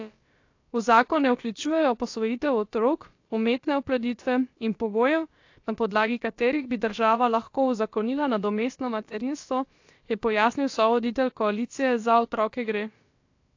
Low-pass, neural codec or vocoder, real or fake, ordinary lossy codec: 7.2 kHz; codec, 16 kHz, about 1 kbps, DyCAST, with the encoder's durations; fake; AAC, 48 kbps